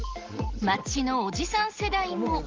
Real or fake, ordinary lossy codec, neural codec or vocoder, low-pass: real; Opus, 16 kbps; none; 7.2 kHz